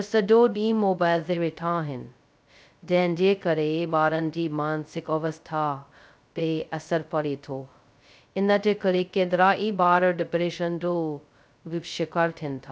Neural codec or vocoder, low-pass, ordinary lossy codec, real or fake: codec, 16 kHz, 0.2 kbps, FocalCodec; none; none; fake